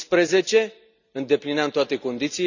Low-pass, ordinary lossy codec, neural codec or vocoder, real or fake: 7.2 kHz; none; none; real